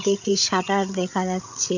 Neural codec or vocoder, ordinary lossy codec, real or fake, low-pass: codec, 16 kHz, 16 kbps, FreqCodec, larger model; none; fake; 7.2 kHz